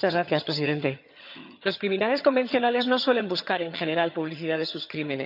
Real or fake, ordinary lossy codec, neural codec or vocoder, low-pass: fake; none; vocoder, 22.05 kHz, 80 mel bands, HiFi-GAN; 5.4 kHz